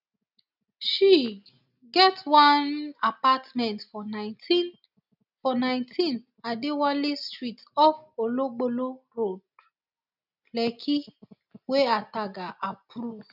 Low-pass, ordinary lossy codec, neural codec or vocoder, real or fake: 5.4 kHz; none; none; real